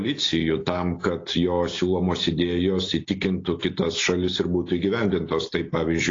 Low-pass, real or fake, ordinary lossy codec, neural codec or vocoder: 7.2 kHz; real; AAC, 32 kbps; none